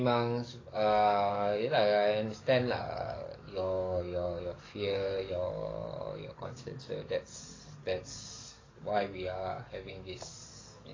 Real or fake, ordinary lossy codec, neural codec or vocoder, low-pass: fake; AAC, 32 kbps; codec, 44.1 kHz, 7.8 kbps, DAC; 7.2 kHz